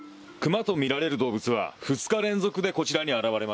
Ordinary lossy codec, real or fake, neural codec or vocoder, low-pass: none; real; none; none